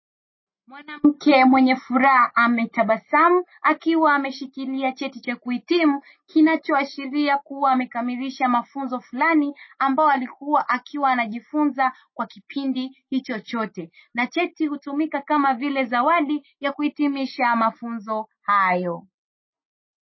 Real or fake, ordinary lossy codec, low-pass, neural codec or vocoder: real; MP3, 24 kbps; 7.2 kHz; none